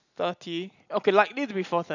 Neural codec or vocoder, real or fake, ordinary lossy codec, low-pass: none; real; none; 7.2 kHz